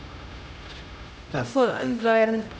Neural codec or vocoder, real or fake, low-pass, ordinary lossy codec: codec, 16 kHz, 0.5 kbps, X-Codec, HuBERT features, trained on LibriSpeech; fake; none; none